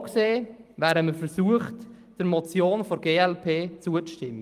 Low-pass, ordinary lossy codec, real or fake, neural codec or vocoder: 14.4 kHz; Opus, 32 kbps; fake; vocoder, 44.1 kHz, 128 mel bands every 256 samples, BigVGAN v2